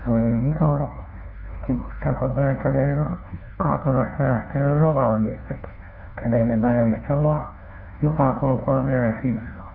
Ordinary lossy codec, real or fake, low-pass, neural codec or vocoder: none; fake; 5.4 kHz; codec, 16 kHz, 1 kbps, FunCodec, trained on LibriTTS, 50 frames a second